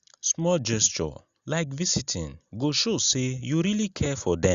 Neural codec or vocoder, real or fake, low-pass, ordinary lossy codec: none; real; 7.2 kHz; Opus, 64 kbps